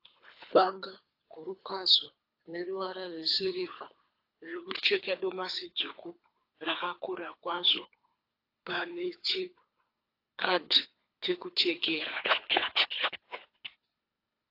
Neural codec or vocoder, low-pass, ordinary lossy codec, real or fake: codec, 24 kHz, 3 kbps, HILCodec; 5.4 kHz; AAC, 32 kbps; fake